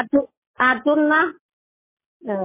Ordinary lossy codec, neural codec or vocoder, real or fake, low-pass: MP3, 16 kbps; none; real; 3.6 kHz